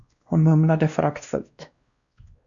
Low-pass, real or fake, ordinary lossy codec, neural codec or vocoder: 7.2 kHz; fake; Opus, 64 kbps; codec, 16 kHz, 1 kbps, X-Codec, WavLM features, trained on Multilingual LibriSpeech